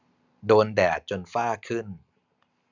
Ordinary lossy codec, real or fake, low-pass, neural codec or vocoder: none; real; 7.2 kHz; none